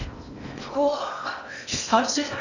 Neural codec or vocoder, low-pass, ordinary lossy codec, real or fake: codec, 16 kHz in and 24 kHz out, 0.8 kbps, FocalCodec, streaming, 65536 codes; 7.2 kHz; none; fake